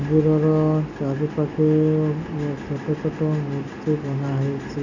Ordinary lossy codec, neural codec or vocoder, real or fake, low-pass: none; none; real; 7.2 kHz